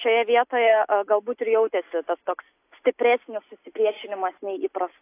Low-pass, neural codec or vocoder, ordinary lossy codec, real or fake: 3.6 kHz; none; AAC, 24 kbps; real